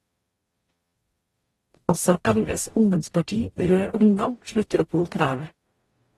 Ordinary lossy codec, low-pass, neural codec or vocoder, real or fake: AAC, 32 kbps; 19.8 kHz; codec, 44.1 kHz, 0.9 kbps, DAC; fake